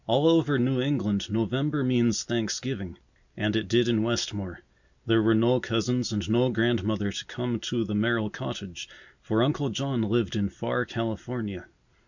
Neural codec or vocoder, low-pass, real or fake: none; 7.2 kHz; real